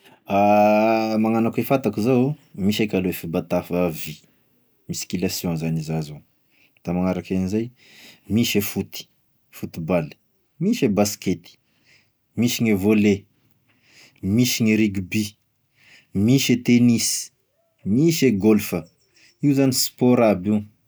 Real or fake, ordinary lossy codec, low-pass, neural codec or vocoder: real; none; none; none